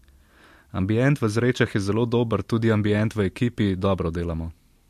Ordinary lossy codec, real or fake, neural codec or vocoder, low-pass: MP3, 64 kbps; real; none; 14.4 kHz